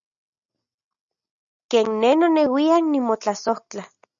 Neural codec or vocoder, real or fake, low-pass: none; real; 7.2 kHz